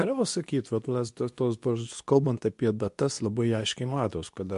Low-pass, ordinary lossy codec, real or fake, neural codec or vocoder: 10.8 kHz; MP3, 64 kbps; fake; codec, 24 kHz, 0.9 kbps, WavTokenizer, medium speech release version 2